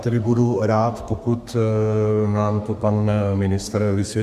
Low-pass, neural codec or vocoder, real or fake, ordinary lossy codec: 14.4 kHz; codec, 32 kHz, 1.9 kbps, SNAC; fake; Opus, 64 kbps